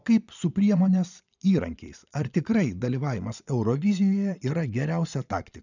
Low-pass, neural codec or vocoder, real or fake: 7.2 kHz; vocoder, 22.05 kHz, 80 mel bands, Vocos; fake